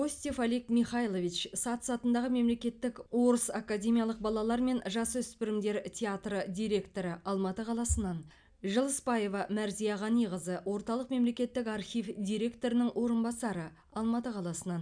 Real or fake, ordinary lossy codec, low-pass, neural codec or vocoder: real; none; 9.9 kHz; none